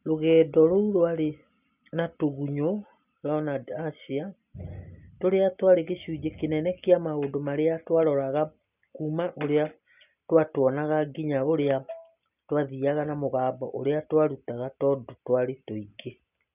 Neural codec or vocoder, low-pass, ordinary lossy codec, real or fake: none; 3.6 kHz; none; real